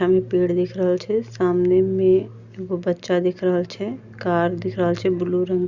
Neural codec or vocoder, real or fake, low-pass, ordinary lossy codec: none; real; 7.2 kHz; Opus, 64 kbps